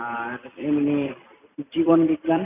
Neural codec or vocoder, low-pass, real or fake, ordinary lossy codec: vocoder, 44.1 kHz, 128 mel bands every 256 samples, BigVGAN v2; 3.6 kHz; fake; none